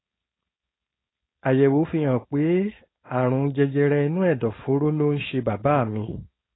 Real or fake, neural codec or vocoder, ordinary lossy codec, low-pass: fake; codec, 16 kHz, 4.8 kbps, FACodec; AAC, 16 kbps; 7.2 kHz